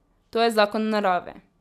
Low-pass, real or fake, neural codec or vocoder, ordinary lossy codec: 14.4 kHz; real; none; none